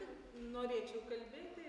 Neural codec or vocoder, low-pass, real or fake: none; 10.8 kHz; real